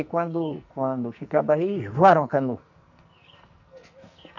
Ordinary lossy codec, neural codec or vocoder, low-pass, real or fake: none; codec, 44.1 kHz, 2.6 kbps, SNAC; 7.2 kHz; fake